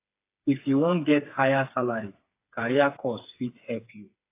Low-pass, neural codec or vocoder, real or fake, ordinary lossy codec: 3.6 kHz; codec, 16 kHz, 4 kbps, FreqCodec, smaller model; fake; AAC, 24 kbps